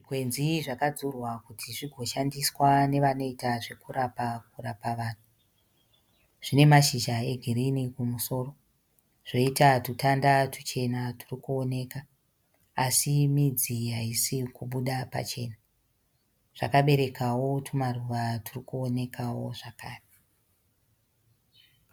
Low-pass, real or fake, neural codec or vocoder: 19.8 kHz; real; none